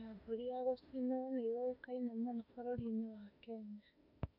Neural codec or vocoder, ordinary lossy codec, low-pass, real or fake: autoencoder, 48 kHz, 32 numbers a frame, DAC-VAE, trained on Japanese speech; none; 5.4 kHz; fake